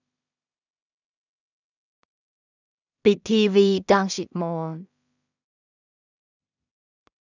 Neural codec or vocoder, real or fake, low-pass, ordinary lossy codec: codec, 16 kHz in and 24 kHz out, 0.4 kbps, LongCat-Audio-Codec, two codebook decoder; fake; 7.2 kHz; none